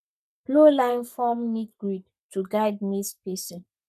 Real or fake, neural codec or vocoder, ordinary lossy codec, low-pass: fake; codec, 44.1 kHz, 7.8 kbps, Pupu-Codec; none; 14.4 kHz